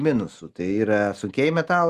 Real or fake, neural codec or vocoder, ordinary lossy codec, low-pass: real; none; Opus, 32 kbps; 14.4 kHz